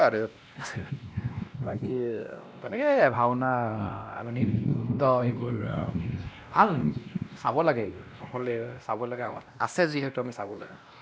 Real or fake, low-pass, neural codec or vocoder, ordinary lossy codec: fake; none; codec, 16 kHz, 1 kbps, X-Codec, WavLM features, trained on Multilingual LibriSpeech; none